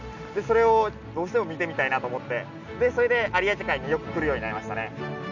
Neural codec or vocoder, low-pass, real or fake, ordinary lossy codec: none; 7.2 kHz; real; none